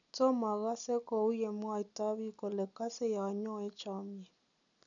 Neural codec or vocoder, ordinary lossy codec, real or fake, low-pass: none; none; real; 7.2 kHz